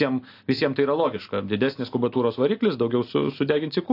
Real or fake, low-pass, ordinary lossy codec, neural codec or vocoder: real; 5.4 kHz; AAC, 32 kbps; none